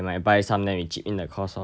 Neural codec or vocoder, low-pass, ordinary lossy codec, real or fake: none; none; none; real